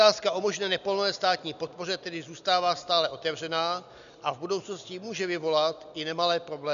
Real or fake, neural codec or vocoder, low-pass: real; none; 7.2 kHz